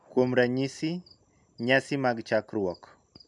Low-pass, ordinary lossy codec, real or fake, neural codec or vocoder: 10.8 kHz; none; real; none